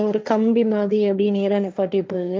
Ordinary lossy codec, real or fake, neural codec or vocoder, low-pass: none; fake; codec, 16 kHz, 1.1 kbps, Voila-Tokenizer; none